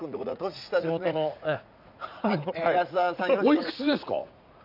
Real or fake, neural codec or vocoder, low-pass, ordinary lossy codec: fake; vocoder, 44.1 kHz, 80 mel bands, Vocos; 5.4 kHz; none